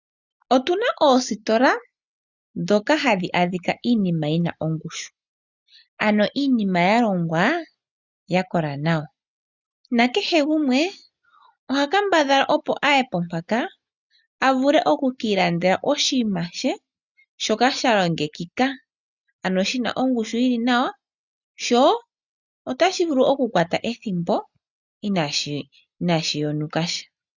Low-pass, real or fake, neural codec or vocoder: 7.2 kHz; real; none